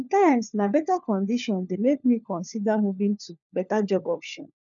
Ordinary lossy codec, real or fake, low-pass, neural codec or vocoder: none; fake; 7.2 kHz; codec, 16 kHz, 4 kbps, FunCodec, trained on LibriTTS, 50 frames a second